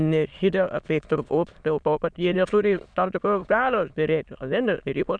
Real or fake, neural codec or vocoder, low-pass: fake; autoencoder, 22.05 kHz, a latent of 192 numbers a frame, VITS, trained on many speakers; 9.9 kHz